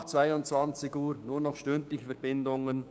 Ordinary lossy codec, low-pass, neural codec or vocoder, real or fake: none; none; codec, 16 kHz, 6 kbps, DAC; fake